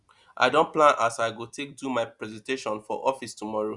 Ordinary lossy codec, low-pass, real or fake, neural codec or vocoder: Opus, 64 kbps; 10.8 kHz; real; none